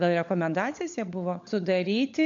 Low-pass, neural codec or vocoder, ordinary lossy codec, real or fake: 7.2 kHz; codec, 16 kHz, 16 kbps, FunCodec, trained on LibriTTS, 50 frames a second; AAC, 64 kbps; fake